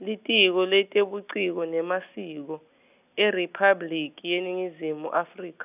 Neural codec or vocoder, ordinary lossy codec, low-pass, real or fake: none; none; 3.6 kHz; real